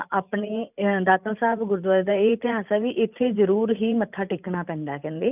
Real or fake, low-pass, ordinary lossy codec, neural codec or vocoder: fake; 3.6 kHz; none; vocoder, 44.1 kHz, 128 mel bands every 512 samples, BigVGAN v2